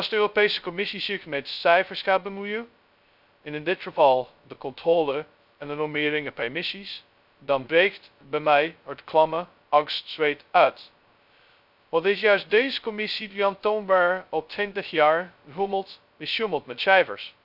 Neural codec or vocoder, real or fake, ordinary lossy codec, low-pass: codec, 16 kHz, 0.2 kbps, FocalCodec; fake; none; 5.4 kHz